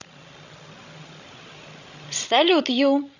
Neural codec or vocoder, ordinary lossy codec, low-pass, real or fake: codec, 16 kHz, 16 kbps, FreqCodec, larger model; none; 7.2 kHz; fake